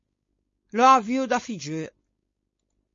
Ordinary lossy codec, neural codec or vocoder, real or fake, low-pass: MP3, 32 kbps; codec, 16 kHz, 4.8 kbps, FACodec; fake; 7.2 kHz